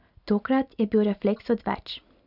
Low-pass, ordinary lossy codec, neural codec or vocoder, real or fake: 5.4 kHz; none; none; real